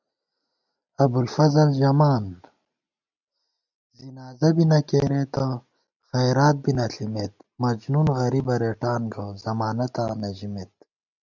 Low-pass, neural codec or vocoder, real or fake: 7.2 kHz; none; real